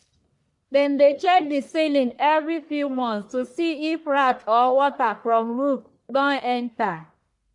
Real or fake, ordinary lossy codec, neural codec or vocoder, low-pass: fake; MP3, 64 kbps; codec, 44.1 kHz, 1.7 kbps, Pupu-Codec; 10.8 kHz